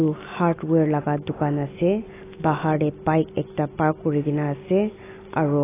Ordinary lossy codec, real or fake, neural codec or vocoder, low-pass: AAC, 16 kbps; real; none; 3.6 kHz